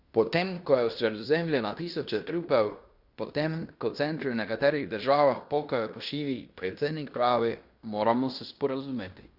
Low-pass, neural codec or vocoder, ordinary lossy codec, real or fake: 5.4 kHz; codec, 16 kHz in and 24 kHz out, 0.9 kbps, LongCat-Audio-Codec, fine tuned four codebook decoder; Opus, 64 kbps; fake